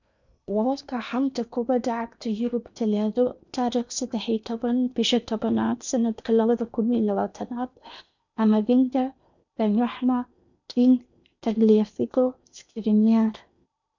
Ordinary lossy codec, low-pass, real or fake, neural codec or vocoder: none; 7.2 kHz; fake; codec, 16 kHz in and 24 kHz out, 0.8 kbps, FocalCodec, streaming, 65536 codes